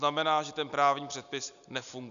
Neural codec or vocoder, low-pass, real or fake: none; 7.2 kHz; real